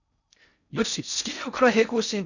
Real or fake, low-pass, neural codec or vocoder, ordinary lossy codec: fake; 7.2 kHz; codec, 16 kHz in and 24 kHz out, 0.6 kbps, FocalCodec, streaming, 4096 codes; none